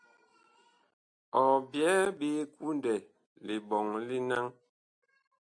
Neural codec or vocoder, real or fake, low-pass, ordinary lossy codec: none; real; 9.9 kHz; MP3, 64 kbps